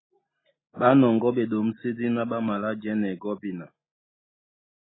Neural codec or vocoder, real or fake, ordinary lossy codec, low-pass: none; real; AAC, 16 kbps; 7.2 kHz